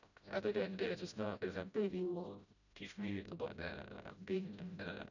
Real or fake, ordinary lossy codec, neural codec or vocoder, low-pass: fake; none; codec, 16 kHz, 0.5 kbps, FreqCodec, smaller model; 7.2 kHz